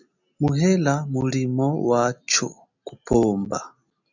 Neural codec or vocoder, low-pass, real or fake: none; 7.2 kHz; real